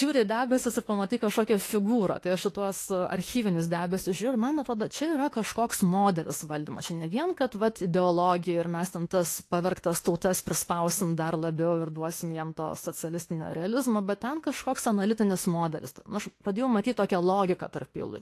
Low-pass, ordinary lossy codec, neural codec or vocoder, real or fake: 14.4 kHz; AAC, 48 kbps; autoencoder, 48 kHz, 32 numbers a frame, DAC-VAE, trained on Japanese speech; fake